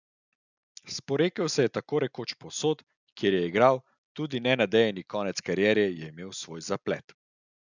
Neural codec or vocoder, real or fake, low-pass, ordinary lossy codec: none; real; 7.2 kHz; none